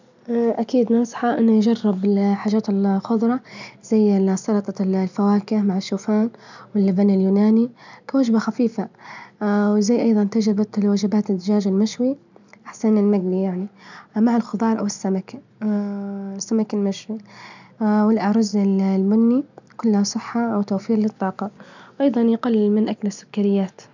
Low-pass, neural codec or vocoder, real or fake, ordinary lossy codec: 7.2 kHz; none; real; none